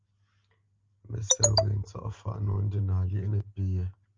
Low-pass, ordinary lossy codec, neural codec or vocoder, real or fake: 7.2 kHz; Opus, 24 kbps; none; real